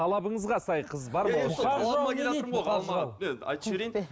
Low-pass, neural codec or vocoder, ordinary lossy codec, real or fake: none; none; none; real